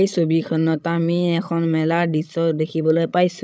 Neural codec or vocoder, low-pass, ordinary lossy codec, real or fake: codec, 16 kHz, 16 kbps, FreqCodec, larger model; none; none; fake